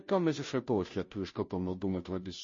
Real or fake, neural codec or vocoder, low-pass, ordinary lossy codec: fake; codec, 16 kHz, 0.5 kbps, FunCodec, trained on Chinese and English, 25 frames a second; 7.2 kHz; MP3, 32 kbps